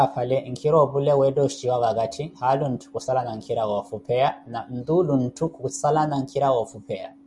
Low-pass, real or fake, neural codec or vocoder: 10.8 kHz; real; none